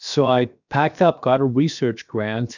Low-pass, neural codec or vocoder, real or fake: 7.2 kHz; codec, 16 kHz, 0.7 kbps, FocalCodec; fake